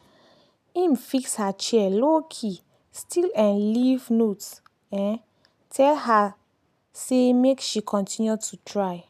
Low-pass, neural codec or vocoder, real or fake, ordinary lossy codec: 14.4 kHz; none; real; none